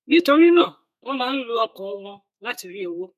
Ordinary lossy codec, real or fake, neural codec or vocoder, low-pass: none; fake; codec, 32 kHz, 1.9 kbps, SNAC; 14.4 kHz